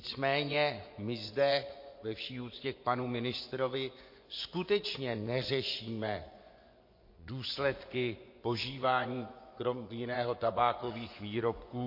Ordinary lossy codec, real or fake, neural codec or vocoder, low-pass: MP3, 32 kbps; fake; vocoder, 24 kHz, 100 mel bands, Vocos; 5.4 kHz